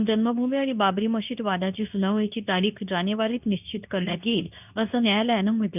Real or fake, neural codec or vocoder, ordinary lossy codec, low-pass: fake; codec, 24 kHz, 0.9 kbps, WavTokenizer, medium speech release version 2; none; 3.6 kHz